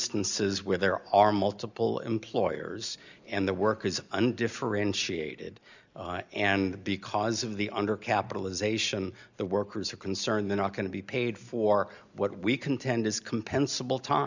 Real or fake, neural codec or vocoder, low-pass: real; none; 7.2 kHz